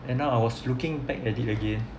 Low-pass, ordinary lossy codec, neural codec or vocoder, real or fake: none; none; none; real